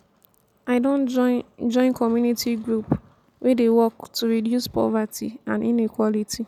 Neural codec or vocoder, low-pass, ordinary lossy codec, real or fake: none; 19.8 kHz; none; real